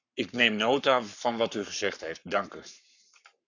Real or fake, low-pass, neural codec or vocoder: fake; 7.2 kHz; codec, 44.1 kHz, 7.8 kbps, Pupu-Codec